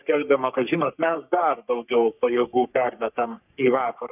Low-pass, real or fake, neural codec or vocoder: 3.6 kHz; fake; codec, 44.1 kHz, 3.4 kbps, Pupu-Codec